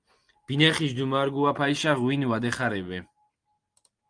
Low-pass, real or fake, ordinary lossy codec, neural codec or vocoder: 9.9 kHz; real; Opus, 32 kbps; none